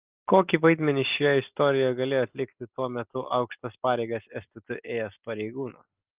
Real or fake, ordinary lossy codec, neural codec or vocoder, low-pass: real; Opus, 32 kbps; none; 3.6 kHz